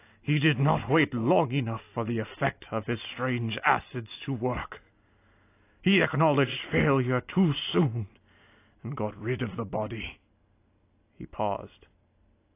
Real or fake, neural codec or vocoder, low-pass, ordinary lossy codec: real; none; 3.6 kHz; AAC, 24 kbps